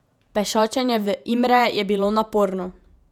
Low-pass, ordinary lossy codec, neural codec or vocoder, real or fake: 19.8 kHz; none; vocoder, 48 kHz, 128 mel bands, Vocos; fake